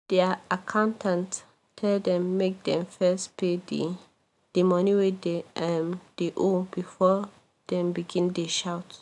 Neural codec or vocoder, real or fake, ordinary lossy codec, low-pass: none; real; none; 10.8 kHz